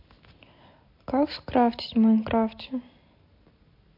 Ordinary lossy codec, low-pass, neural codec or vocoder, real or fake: MP3, 32 kbps; 5.4 kHz; none; real